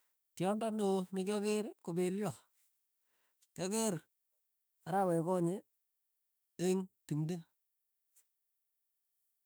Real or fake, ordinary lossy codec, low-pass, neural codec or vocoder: fake; none; none; autoencoder, 48 kHz, 32 numbers a frame, DAC-VAE, trained on Japanese speech